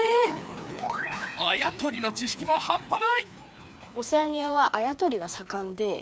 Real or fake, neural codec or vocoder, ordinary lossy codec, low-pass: fake; codec, 16 kHz, 2 kbps, FreqCodec, larger model; none; none